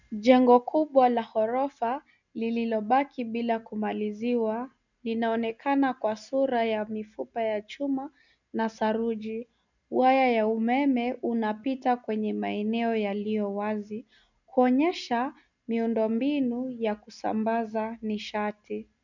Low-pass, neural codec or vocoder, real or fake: 7.2 kHz; none; real